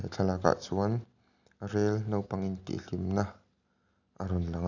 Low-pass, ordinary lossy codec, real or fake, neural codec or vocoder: 7.2 kHz; none; real; none